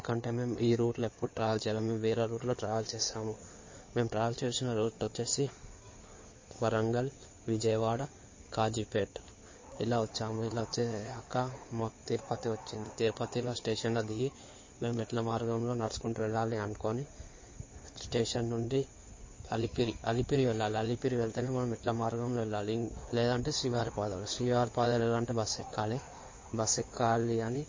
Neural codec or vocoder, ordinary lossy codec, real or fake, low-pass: codec, 16 kHz in and 24 kHz out, 2.2 kbps, FireRedTTS-2 codec; MP3, 32 kbps; fake; 7.2 kHz